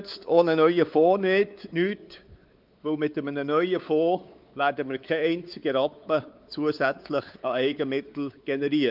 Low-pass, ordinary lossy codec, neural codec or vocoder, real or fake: 5.4 kHz; Opus, 24 kbps; codec, 16 kHz, 4 kbps, X-Codec, WavLM features, trained on Multilingual LibriSpeech; fake